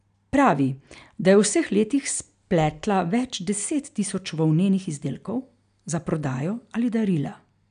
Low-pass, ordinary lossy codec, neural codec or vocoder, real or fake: 9.9 kHz; none; none; real